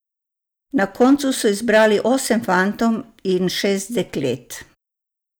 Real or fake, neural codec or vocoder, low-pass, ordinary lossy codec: real; none; none; none